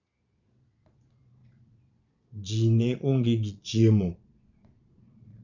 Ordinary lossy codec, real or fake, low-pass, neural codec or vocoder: none; fake; 7.2 kHz; vocoder, 22.05 kHz, 80 mel bands, Vocos